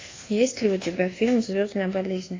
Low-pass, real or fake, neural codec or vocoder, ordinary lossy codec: 7.2 kHz; fake; codec, 24 kHz, 1.2 kbps, DualCodec; AAC, 32 kbps